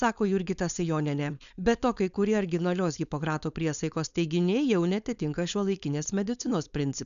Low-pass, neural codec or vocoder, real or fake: 7.2 kHz; codec, 16 kHz, 4.8 kbps, FACodec; fake